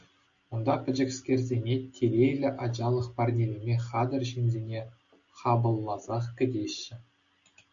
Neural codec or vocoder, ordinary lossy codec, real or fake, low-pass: none; Opus, 64 kbps; real; 7.2 kHz